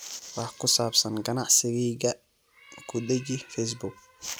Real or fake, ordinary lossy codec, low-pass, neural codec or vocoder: real; none; none; none